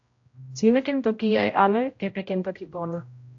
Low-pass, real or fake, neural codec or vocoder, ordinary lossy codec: 7.2 kHz; fake; codec, 16 kHz, 0.5 kbps, X-Codec, HuBERT features, trained on general audio; AAC, 48 kbps